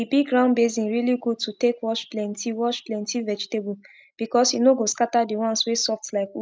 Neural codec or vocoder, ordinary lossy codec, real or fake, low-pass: none; none; real; none